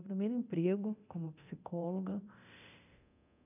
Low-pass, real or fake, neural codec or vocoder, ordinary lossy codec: 3.6 kHz; fake; codec, 24 kHz, 0.9 kbps, DualCodec; none